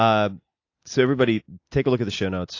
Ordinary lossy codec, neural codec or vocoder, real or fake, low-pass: AAC, 48 kbps; none; real; 7.2 kHz